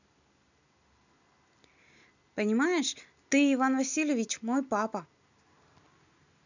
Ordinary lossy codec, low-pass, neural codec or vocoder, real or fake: none; 7.2 kHz; none; real